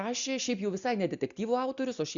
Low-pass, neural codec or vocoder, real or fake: 7.2 kHz; none; real